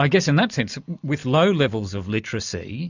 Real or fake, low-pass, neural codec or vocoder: real; 7.2 kHz; none